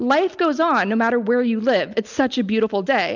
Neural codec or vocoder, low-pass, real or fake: none; 7.2 kHz; real